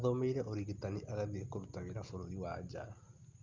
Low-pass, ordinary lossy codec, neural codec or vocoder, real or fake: 7.2 kHz; Opus, 24 kbps; none; real